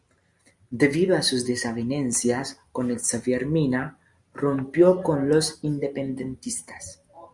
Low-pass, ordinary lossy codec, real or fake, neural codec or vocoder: 10.8 kHz; Opus, 64 kbps; real; none